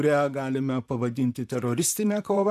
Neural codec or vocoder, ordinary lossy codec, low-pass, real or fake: vocoder, 44.1 kHz, 128 mel bands, Pupu-Vocoder; AAC, 96 kbps; 14.4 kHz; fake